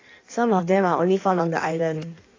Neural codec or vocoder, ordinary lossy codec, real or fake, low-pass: codec, 16 kHz in and 24 kHz out, 1.1 kbps, FireRedTTS-2 codec; AAC, 32 kbps; fake; 7.2 kHz